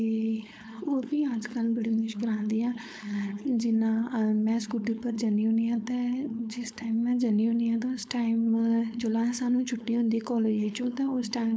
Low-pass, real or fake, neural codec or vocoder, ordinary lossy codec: none; fake; codec, 16 kHz, 4.8 kbps, FACodec; none